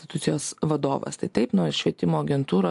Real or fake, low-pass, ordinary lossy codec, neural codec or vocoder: real; 10.8 kHz; AAC, 64 kbps; none